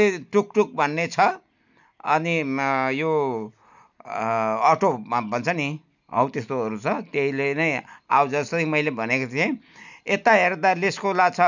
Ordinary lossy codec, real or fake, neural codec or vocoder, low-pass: none; real; none; 7.2 kHz